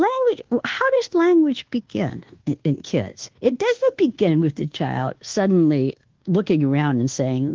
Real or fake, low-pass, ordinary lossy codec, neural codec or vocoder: fake; 7.2 kHz; Opus, 16 kbps; codec, 24 kHz, 1.2 kbps, DualCodec